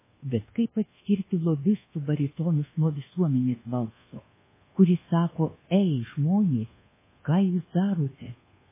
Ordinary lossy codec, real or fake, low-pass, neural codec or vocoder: MP3, 16 kbps; fake; 3.6 kHz; codec, 24 kHz, 1.2 kbps, DualCodec